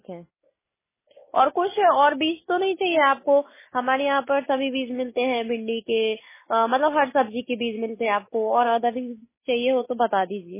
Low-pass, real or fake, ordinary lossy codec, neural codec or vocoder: 3.6 kHz; real; MP3, 16 kbps; none